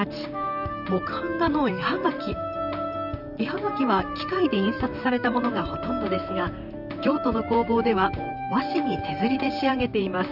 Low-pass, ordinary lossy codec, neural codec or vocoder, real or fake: 5.4 kHz; none; vocoder, 44.1 kHz, 128 mel bands, Pupu-Vocoder; fake